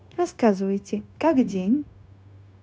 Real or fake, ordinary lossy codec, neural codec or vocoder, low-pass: fake; none; codec, 16 kHz, 0.9 kbps, LongCat-Audio-Codec; none